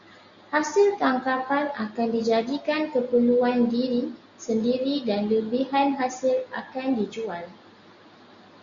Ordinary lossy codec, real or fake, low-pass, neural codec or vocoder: Opus, 64 kbps; real; 7.2 kHz; none